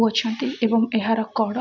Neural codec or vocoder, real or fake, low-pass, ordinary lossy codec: none; real; 7.2 kHz; none